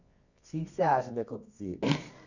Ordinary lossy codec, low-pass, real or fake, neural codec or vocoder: none; 7.2 kHz; fake; codec, 24 kHz, 0.9 kbps, WavTokenizer, medium music audio release